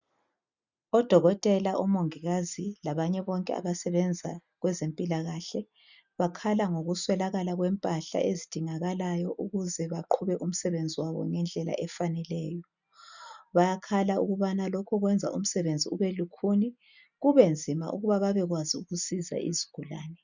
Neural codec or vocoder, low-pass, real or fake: none; 7.2 kHz; real